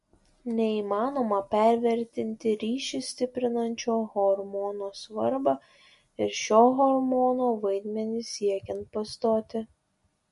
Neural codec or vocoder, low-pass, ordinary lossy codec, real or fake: none; 14.4 kHz; MP3, 48 kbps; real